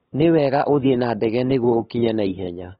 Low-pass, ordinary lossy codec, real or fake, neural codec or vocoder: 7.2 kHz; AAC, 16 kbps; fake; codec, 16 kHz, 8 kbps, FunCodec, trained on LibriTTS, 25 frames a second